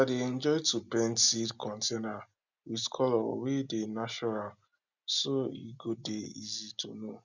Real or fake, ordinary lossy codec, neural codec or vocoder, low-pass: real; none; none; 7.2 kHz